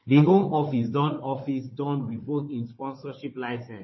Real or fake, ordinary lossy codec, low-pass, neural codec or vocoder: fake; MP3, 24 kbps; 7.2 kHz; codec, 16 kHz, 4 kbps, FunCodec, trained on Chinese and English, 50 frames a second